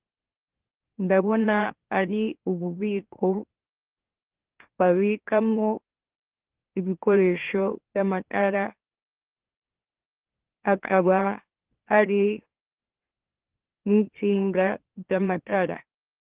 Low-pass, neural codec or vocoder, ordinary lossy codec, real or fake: 3.6 kHz; autoencoder, 44.1 kHz, a latent of 192 numbers a frame, MeloTTS; Opus, 16 kbps; fake